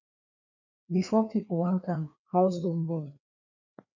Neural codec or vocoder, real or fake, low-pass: codec, 16 kHz, 2 kbps, FreqCodec, larger model; fake; 7.2 kHz